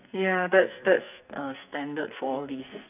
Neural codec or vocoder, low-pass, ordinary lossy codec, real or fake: codec, 44.1 kHz, 2.6 kbps, SNAC; 3.6 kHz; none; fake